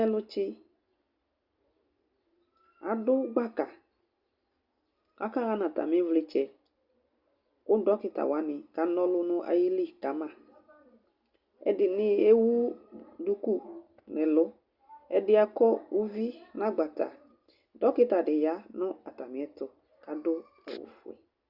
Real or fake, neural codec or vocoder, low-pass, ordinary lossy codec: real; none; 5.4 kHz; Opus, 64 kbps